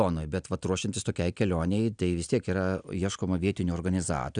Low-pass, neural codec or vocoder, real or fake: 9.9 kHz; none; real